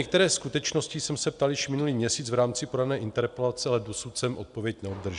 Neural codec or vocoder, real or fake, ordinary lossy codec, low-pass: none; real; AAC, 96 kbps; 10.8 kHz